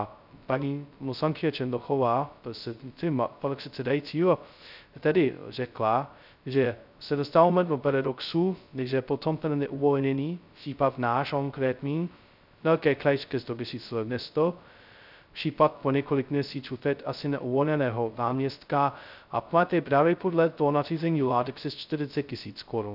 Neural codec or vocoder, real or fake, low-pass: codec, 16 kHz, 0.2 kbps, FocalCodec; fake; 5.4 kHz